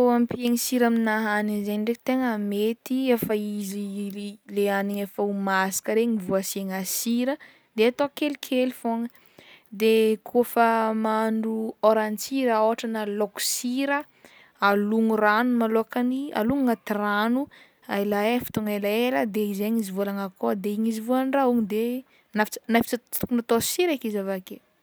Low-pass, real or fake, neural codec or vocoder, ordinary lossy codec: none; real; none; none